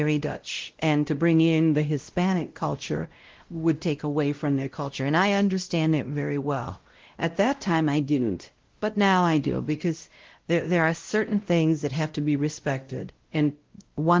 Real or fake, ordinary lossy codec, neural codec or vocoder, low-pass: fake; Opus, 16 kbps; codec, 16 kHz, 0.5 kbps, X-Codec, WavLM features, trained on Multilingual LibriSpeech; 7.2 kHz